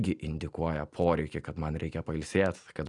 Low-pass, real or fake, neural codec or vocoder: 10.8 kHz; real; none